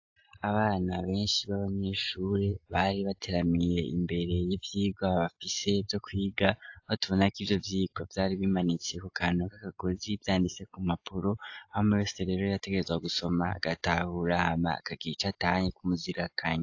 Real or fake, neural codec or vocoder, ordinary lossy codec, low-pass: real; none; AAC, 48 kbps; 7.2 kHz